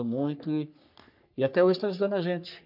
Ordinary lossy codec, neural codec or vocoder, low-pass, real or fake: none; codec, 44.1 kHz, 3.4 kbps, Pupu-Codec; 5.4 kHz; fake